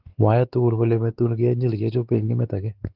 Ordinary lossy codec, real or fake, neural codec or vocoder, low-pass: Opus, 16 kbps; fake; codec, 16 kHz, 4 kbps, X-Codec, WavLM features, trained on Multilingual LibriSpeech; 5.4 kHz